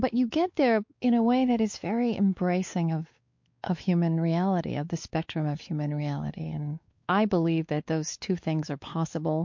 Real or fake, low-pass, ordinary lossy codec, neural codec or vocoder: fake; 7.2 kHz; MP3, 48 kbps; codec, 16 kHz, 4 kbps, X-Codec, WavLM features, trained on Multilingual LibriSpeech